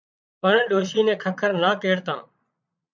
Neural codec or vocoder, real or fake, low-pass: vocoder, 22.05 kHz, 80 mel bands, Vocos; fake; 7.2 kHz